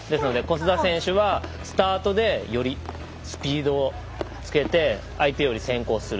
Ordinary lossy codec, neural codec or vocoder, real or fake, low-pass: none; none; real; none